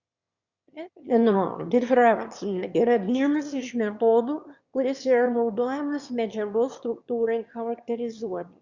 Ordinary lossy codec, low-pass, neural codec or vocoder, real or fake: Opus, 64 kbps; 7.2 kHz; autoencoder, 22.05 kHz, a latent of 192 numbers a frame, VITS, trained on one speaker; fake